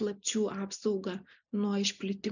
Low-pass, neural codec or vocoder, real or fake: 7.2 kHz; none; real